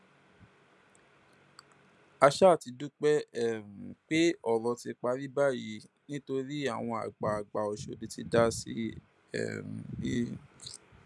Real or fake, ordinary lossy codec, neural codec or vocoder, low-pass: real; none; none; none